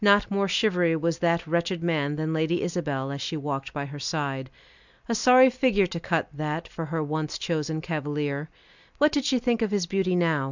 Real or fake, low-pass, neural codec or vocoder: real; 7.2 kHz; none